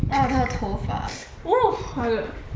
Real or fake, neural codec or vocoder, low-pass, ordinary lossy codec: real; none; none; none